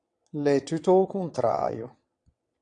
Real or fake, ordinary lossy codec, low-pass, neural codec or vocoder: fake; AAC, 48 kbps; 9.9 kHz; vocoder, 22.05 kHz, 80 mel bands, WaveNeXt